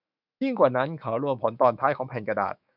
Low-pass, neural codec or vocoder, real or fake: 5.4 kHz; autoencoder, 48 kHz, 128 numbers a frame, DAC-VAE, trained on Japanese speech; fake